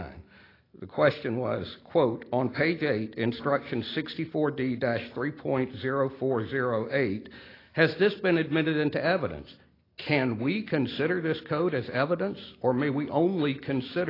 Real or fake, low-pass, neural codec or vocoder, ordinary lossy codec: real; 5.4 kHz; none; AAC, 24 kbps